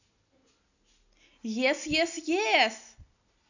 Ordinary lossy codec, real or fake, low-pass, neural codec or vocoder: none; real; 7.2 kHz; none